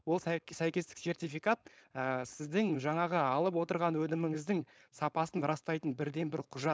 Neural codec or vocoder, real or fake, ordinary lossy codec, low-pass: codec, 16 kHz, 4.8 kbps, FACodec; fake; none; none